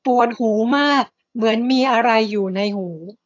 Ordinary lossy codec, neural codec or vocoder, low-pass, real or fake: AAC, 48 kbps; vocoder, 22.05 kHz, 80 mel bands, HiFi-GAN; 7.2 kHz; fake